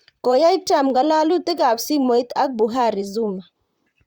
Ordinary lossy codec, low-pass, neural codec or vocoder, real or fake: Opus, 64 kbps; 19.8 kHz; vocoder, 44.1 kHz, 128 mel bands, Pupu-Vocoder; fake